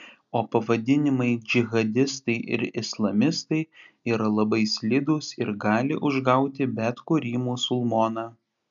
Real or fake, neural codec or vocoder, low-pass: real; none; 7.2 kHz